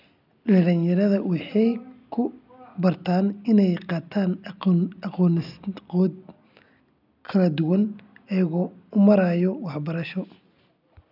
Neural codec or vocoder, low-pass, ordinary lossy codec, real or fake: none; 5.4 kHz; none; real